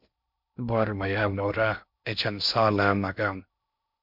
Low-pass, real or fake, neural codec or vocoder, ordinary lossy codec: 5.4 kHz; fake; codec, 16 kHz in and 24 kHz out, 0.6 kbps, FocalCodec, streaming, 2048 codes; MP3, 48 kbps